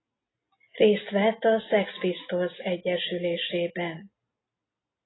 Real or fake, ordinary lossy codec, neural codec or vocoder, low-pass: real; AAC, 16 kbps; none; 7.2 kHz